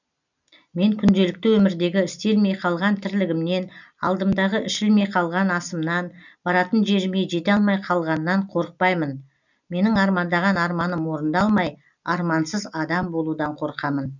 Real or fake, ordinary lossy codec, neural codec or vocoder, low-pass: real; none; none; 7.2 kHz